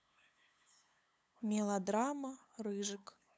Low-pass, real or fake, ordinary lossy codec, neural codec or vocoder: none; fake; none; codec, 16 kHz, 8 kbps, FunCodec, trained on LibriTTS, 25 frames a second